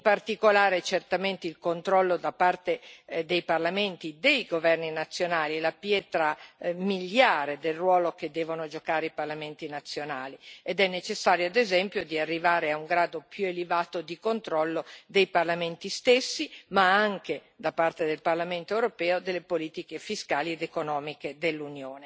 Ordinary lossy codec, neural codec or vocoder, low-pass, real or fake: none; none; none; real